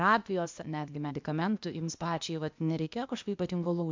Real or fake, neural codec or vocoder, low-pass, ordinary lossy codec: fake; codec, 16 kHz, 0.8 kbps, ZipCodec; 7.2 kHz; MP3, 64 kbps